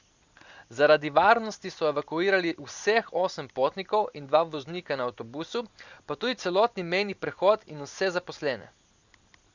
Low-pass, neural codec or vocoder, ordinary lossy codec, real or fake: 7.2 kHz; none; Opus, 64 kbps; real